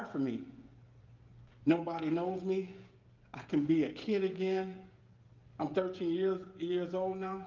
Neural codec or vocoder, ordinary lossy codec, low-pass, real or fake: codec, 16 kHz, 16 kbps, FreqCodec, smaller model; Opus, 32 kbps; 7.2 kHz; fake